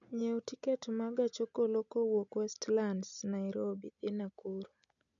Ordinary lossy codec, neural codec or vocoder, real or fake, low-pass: none; none; real; 7.2 kHz